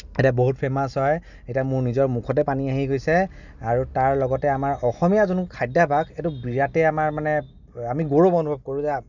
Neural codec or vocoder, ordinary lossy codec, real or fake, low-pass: none; none; real; 7.2 kHz